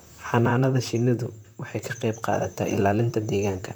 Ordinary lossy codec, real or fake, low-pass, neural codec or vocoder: none; fake; none; vocoder, 44.1 kHz, 128 mel bands, Pupu-Vocoder